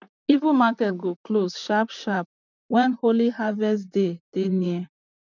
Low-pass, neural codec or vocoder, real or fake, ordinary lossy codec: 7.2 kHz; vocoder, 44.1 kHz, 128 mel bands, Pupu-Vocoder; fake; none